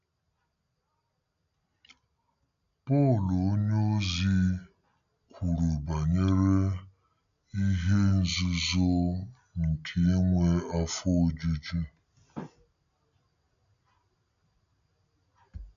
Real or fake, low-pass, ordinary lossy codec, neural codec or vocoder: real; 7.2 kHz; none; none